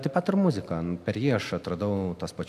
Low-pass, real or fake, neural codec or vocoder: 14.4 kHz; real; none